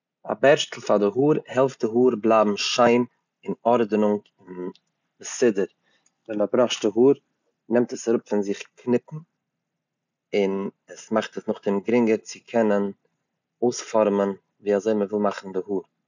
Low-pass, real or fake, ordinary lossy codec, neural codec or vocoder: 7.2 kHz; real; none; none